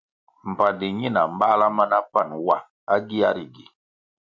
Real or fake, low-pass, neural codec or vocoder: real; 7.2 kHz; none